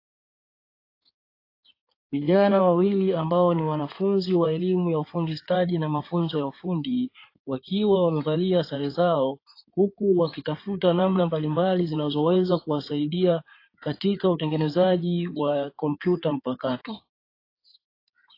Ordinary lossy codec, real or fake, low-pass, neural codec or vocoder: AAC, 32 kbps; fake; 5.4 kHz; codec, 16 kHz in and 24 kHz out, 2.2 kbps, FireRedTTS-2 codec